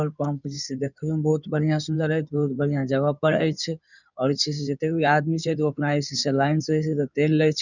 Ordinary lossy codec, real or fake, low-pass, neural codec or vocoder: none; fake; 7.2 kHz; codec, 16 kHz in and 24 kHz out, 2.2 kbps, FireRedTTS-2 codec